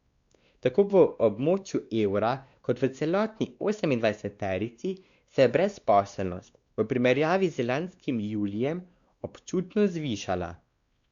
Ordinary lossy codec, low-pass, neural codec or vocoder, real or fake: Opus, 64 kbps; 7.2 kHz; codec, 16 kHz, 2 kbps, X-Codec, WavLM features, trained on Multilingual LibriSpeech; fake